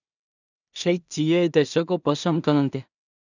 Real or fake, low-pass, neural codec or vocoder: fake; 7.2 kHz; codec, 16 kHz in and 24 kHz out, 0.4 kbps, LongCat-Audio-Codec, two codebook decoder